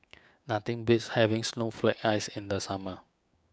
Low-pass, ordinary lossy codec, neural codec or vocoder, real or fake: none; none; codec, 16 kHz, 6 kbps, DAC; fake